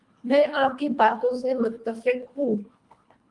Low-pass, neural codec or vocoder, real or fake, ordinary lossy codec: 10.8 kHz; codec, 24 kHz, 1.5 kbps, HILCodec; fake; Opus, 32 kbps